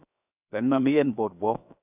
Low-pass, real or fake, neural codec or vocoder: 3.6 kHz; fake; codec, 16 kHz, 0.7 kbps, FocalCodec